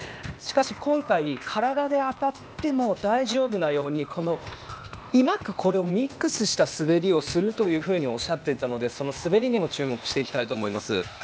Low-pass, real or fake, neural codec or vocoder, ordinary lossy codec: none; fake; codec, 16 kHz, 0.8 kbps, ZipCodec; none